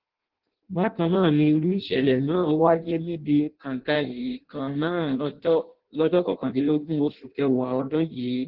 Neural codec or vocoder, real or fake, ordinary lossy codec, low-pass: codec, 16 kHz in and 24 kHz out, 0.6 kbps, FireRedTTS-2 codec; fake; Opus, 16 kbps; 5.4 kHz